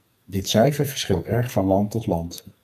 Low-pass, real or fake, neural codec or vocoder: 14.4 kHz; fake; codec, 44.1 kHz, 2.6 kbps, SNAC